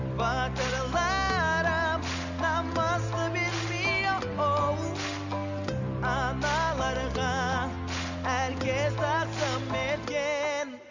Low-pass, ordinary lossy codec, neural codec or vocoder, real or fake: 7.2 kHz; Opus, 64 kbps; none; real